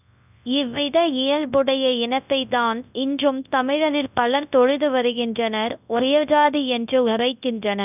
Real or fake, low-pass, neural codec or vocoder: fake; 3.6 kHz; codec, 24 kHz, 0.9 kbps, WavTokenizer, large speech release